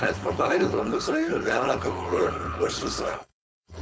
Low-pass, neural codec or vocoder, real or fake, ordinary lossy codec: none; codec, 16 kHz, 4.8 kbps, FACodec; fake; none